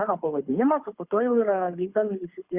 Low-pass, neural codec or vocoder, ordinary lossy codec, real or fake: 3.6 kHz; codec, 24 kHz, 6 kbps, HILCodec; Opus, 64 kbps; fake